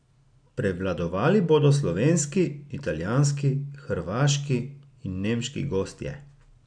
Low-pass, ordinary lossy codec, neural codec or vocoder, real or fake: 9.9 kHz; none; none; real